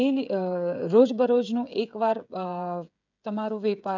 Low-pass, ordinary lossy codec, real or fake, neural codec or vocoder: 7.2 kHz; none; fake; codec, 16 kHz, 16 kbps, FreqCodec, smaller model